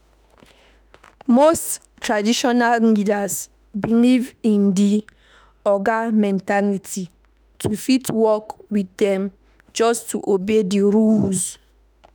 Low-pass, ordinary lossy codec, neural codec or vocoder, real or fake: none; none; autoencoder, 48 kHz, 32 numbers a frame, DAC-VAE, trained on Japanese speech; fake